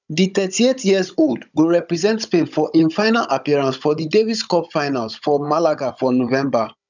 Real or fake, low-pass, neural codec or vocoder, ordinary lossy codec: fake; 7.2 kHz; codec, 16 kHz, 16 kbps, FunCodec, trained on Chinese and English, 50 frames a second; none